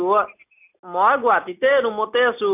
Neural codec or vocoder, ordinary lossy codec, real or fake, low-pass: none; none; real; 3.6 kHz